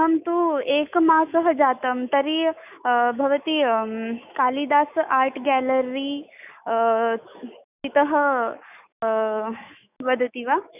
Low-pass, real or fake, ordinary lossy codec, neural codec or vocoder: 3.6 kHz; fake; none; autoencoder, 48 kHz, 128 numbers a frame, DAC-VAE, trained on Japanese speech